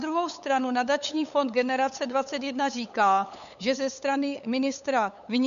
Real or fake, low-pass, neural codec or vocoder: fake; 7.2 kHz; codec, 16 kHz, 8 kbps, FunCodec, trained on LibriTTS, 25 frames a second